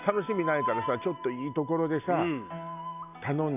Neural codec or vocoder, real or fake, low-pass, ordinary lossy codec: none; real; 3.6 kHz; none